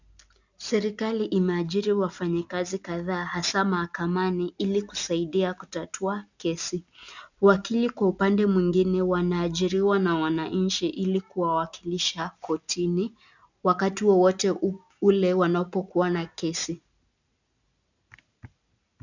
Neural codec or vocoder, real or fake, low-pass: none; real; 7.2 kHz